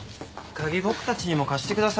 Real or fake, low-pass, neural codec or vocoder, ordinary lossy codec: real; none; none; none